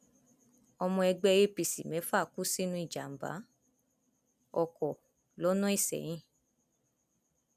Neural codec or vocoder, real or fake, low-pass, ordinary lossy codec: none; real; 14.4 kHz; none